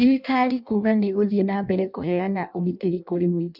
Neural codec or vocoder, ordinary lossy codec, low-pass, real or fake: codec, 16 kHz in and 24 kHz out, 0.6 kbps, FireRedTTS-2 codec; none; 5.4 kHz; fake